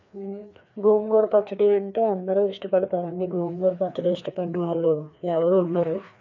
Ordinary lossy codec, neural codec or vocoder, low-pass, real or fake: MP3, 64 kbps; codec, 16 kHz, 2 kbps, FreqCodec, larger model; 7.2 kHz; fake